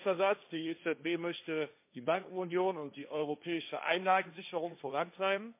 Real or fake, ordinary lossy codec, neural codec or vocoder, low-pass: fake; MP3, 24 kbps; codec, 16 kHz, 1.1 kbps, Voila-Tokenizer; 3.6 kHz